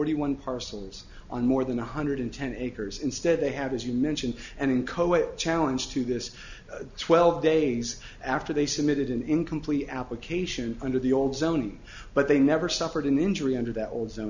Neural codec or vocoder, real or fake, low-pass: none; real; 7.2 kHz